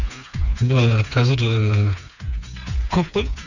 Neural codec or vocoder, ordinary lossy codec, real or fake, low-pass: codec, 16 kHz, 4 kbps, FreqCodec, smaller model; none; fake; 7.2 kHz